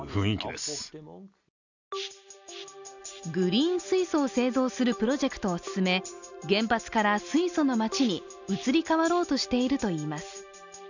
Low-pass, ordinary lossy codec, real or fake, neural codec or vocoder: 7.2 kHz; none; real; none